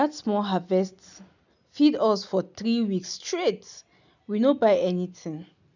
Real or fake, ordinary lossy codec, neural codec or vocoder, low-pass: real; none; none; 7.2 kHz